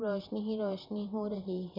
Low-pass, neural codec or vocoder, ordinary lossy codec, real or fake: 5.4 kHz; vocoder, 44.1 kHz, 128 mel bands, Pupu-Vocoder; none; fake